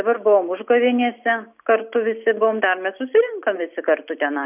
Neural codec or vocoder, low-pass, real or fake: none; 3.6 kHz; real